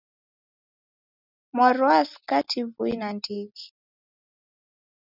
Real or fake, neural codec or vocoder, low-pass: real; none; 5.4 kHz